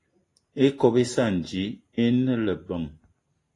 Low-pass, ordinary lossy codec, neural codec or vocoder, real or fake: 10.8 kHz; AAC, 32 kbps; none; real